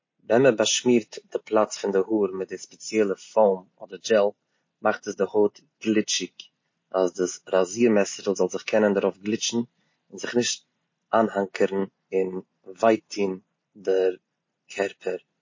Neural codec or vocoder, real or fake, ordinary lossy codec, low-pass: none; real; MP3, 32 kbps; 7.2 kHz